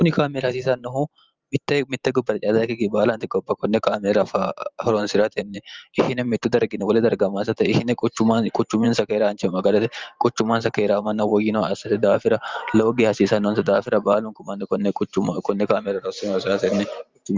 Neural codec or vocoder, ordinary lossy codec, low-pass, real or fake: vocoder, 44.1 kHz, 128 mel bands every 512 samples, BigVGAN v2; Opus, 24 kbps; 7.2 kHz; fake